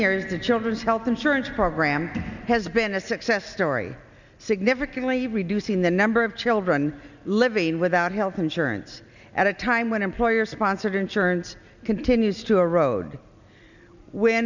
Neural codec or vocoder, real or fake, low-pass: none; real; 7.2 kHz